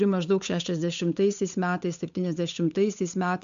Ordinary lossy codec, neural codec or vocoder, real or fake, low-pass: MP3, 64 kbps; codec, 16 kHz, 8 kbps, FunCodec, trained on Chinese and English, 25 frames a second; fake; 7.2 kHz